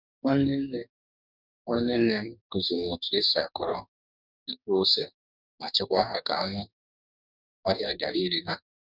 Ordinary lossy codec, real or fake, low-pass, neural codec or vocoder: none; fake; 5.4 kHz; codec, 44.1 kHz, 2.6 kbps, DAC